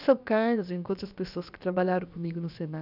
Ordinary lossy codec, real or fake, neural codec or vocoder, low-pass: none; fake; codec, 16 kHz, about 1 kbps, DyCAST, with the encoder's durations; 5.4 kHz